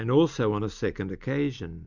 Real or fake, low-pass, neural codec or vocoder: real; 7.2 kHz; none